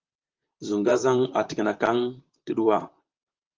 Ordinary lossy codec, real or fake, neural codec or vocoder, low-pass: Opus, 32 kbps; fake; vocoder, 44.1 kHz, 128 mel bands, Pupu-Vocoder; 7.2 kHz